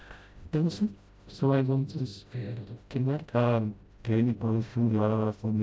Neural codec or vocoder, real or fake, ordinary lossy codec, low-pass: codec, 16 kHz, 0.5 kbps, FreqCodec, smaller model; fake; none; none